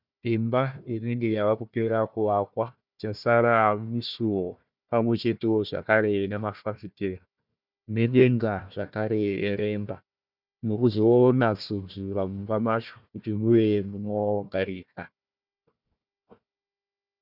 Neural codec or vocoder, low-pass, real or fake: codec, 16 kHz, 1 kbps, FunCodec, trained on Chinese and English, 50 frames a second; 5.4 kHz; fake